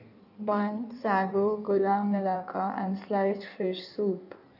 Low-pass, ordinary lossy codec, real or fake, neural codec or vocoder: 5.4 kHz; none; fake; codec, 16 kHz in and 24 kHz out, 1.1 kbps, FireRedTTS-2 codec